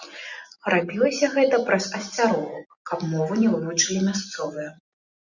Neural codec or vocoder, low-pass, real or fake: none; 7.2 kHz; real